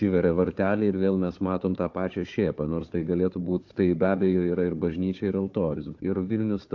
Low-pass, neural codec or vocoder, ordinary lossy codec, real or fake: 7.2 kHz; codec, 16 kHz, 4 kbps, FunCodec, trained on Chinese and English, 50 frames a second; AAC, 48 kbps; fake